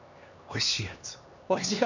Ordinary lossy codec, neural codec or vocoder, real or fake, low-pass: none; codec, 16 kHz, 2 kbps, X-Codec, HuBERT features, trained on LibriSpeech; fake; 7.2 kHz